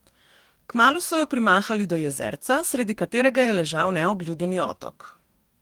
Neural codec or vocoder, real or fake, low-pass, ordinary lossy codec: codec, 44.1 kHz, 2.6 kbps, DAC; fake; 19.8 kHz; Opus, 32 kbps